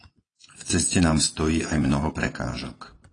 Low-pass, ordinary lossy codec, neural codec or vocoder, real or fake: 9.9 kHz; AAC, 32 kbps; vocoder, 22.05 kHz, 80 mel bands, Vocos; fake